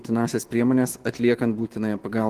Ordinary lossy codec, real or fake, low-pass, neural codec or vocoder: Opus, 16 kbps; fake; 14.4 kHz; autoencoder, 48 kHz, 128 numbers a frame, DAC-VAE, trained on Japanese speech